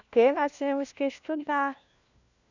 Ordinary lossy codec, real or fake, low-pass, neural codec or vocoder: none; fake; 7.2 kHz; codec, 16 kHz, 1 kbps, FunCodec, trained on LibriTTS, 50 frames a second